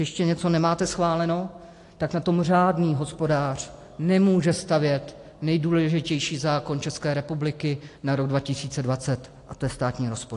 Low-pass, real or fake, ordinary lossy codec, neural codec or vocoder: 10.8 kHz; real; AAC, 48 kbps; none